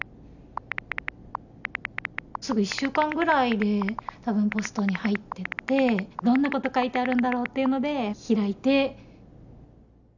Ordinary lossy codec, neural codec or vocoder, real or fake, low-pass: none; none; real; 7.2 kHz